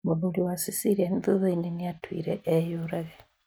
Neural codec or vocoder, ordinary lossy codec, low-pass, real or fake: none; none; none; real